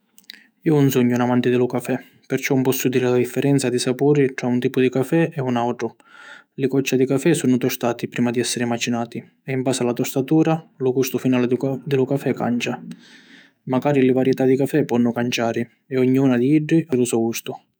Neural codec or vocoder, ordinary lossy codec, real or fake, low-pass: autoencoder, 48 kHz, 128 numbers a frame, DAC-VAE, trained on Japanese speech; none; fake; none